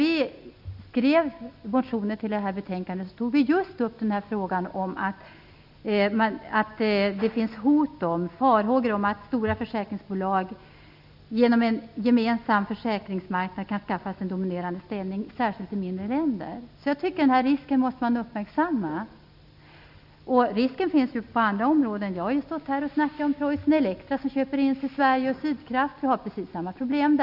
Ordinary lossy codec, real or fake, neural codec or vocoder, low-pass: none; real; none; 5.4 kHz